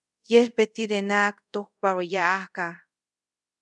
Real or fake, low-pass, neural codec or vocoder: fake; 10.8 kHz; codec, 24 kHz, 0.5 kbps, DualCodec